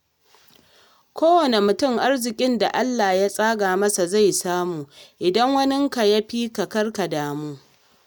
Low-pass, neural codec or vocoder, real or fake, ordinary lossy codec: none; none; real; none